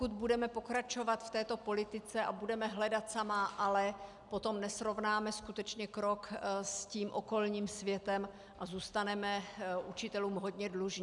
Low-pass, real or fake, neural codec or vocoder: 10.8 kHz; real; none